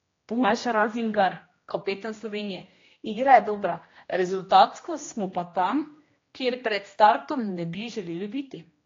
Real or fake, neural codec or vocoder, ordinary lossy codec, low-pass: fake; codec, 16 kHz, 1 kbps, X-Codec, HuBERT features, trained on general audio; AAC, 32 kbps; 7.2 kHz